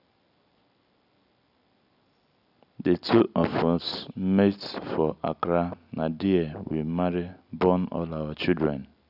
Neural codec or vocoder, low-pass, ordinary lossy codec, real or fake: none; 5.4 kHz; none; real